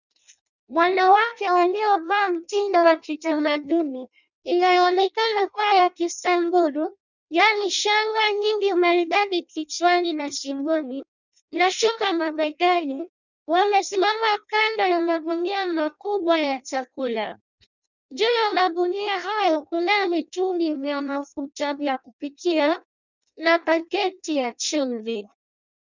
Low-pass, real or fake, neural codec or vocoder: 7.2 kHz; fake; codec, 16 kHz in and 24 kHz out, 0.6 kbps, FireRedTTS-2 codec